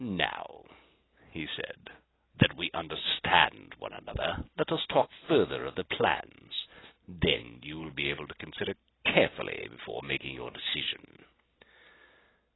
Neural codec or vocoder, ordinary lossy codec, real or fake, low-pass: none; AAC, 16 kbps; real; 7.2 kHz